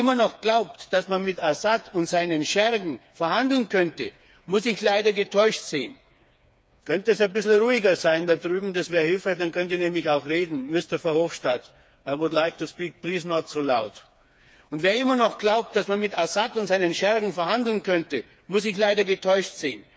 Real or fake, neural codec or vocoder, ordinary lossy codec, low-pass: fake; codec, 16 kHz, 4 kbps, FreqCodec, smaller model; none; none